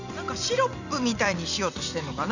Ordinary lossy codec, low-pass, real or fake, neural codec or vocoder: none; 7.2 kHz; fake; vocoder, 44.1 kHz, 128 mel bands every 256 samples, BigVGAN v2